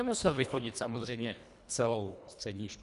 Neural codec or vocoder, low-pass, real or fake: codec, 24 kHz, 1.5 kbps, HILCodec; 10.8 kHz; fake